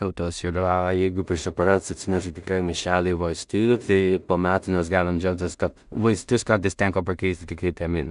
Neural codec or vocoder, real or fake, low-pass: codec, 16 kHz in and 24 kHz out, 0.4 kbps, LongCat-Audio-Codec, two codebook decoder; fake; 10.8 kHz